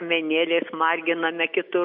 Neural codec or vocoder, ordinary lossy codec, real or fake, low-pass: none; MP3, 48 kbps; real; 5.4 kHz